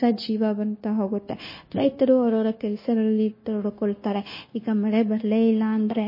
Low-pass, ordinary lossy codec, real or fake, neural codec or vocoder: 5.4 kHz; MP3, 24 kbps; fake; codec, 16 kHz, 0.9 kbps, LongCat-Audio-Codec